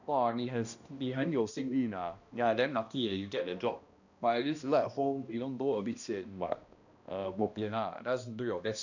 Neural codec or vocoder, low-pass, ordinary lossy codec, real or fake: codec, 16 kHz, 1 kbps, X-Codec, HuBERT features, trained on balanced general audio; 7.2 kHz; none; fake